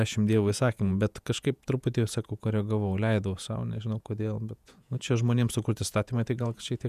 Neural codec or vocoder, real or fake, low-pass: vocoder, 48 kHz, 128 mel bands, Vocos; fake; 14.4 kHz